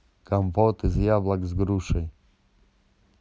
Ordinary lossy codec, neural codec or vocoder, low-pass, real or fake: none; none; none; real